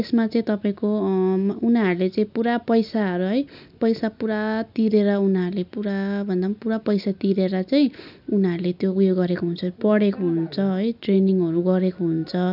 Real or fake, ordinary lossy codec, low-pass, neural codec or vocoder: real; none; 5.4 kHz; none